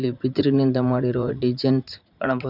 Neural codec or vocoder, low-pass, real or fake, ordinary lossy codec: vocoder, 44.1 kHz, 80 mel bands, Vocos; 5.4 kHz; fake; none